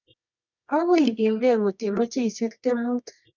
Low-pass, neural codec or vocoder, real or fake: 7.2 kHz; codec, 24 kHz, 0.9 kbps, WavTokenizer, medium music audio release; fake